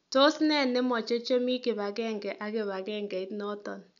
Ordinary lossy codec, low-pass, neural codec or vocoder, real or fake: none; 7.2 kHz; none; real